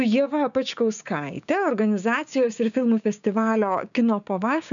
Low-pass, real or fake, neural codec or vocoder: 7.2 kHz; fake; codec, 16 kHz, 6 kbps, DAC